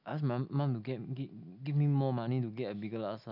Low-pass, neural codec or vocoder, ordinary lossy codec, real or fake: 5.4 kHz; none; none; real